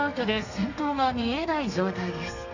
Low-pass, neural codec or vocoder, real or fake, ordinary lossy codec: 7.2 kHz; codec, 32 kHz, 1.9 kbps, SNAC; fake; none